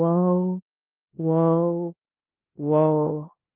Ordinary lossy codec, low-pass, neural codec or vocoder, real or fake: Opus, 32 kbps; 3.6 kHz; codec, 16 kHz in and 24 kHz out, 0.9 kbps, LongCat-Audio-Codec, four codebook decoder; fake